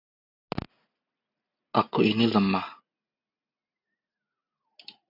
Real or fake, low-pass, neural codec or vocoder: real; 5.4 kHz; none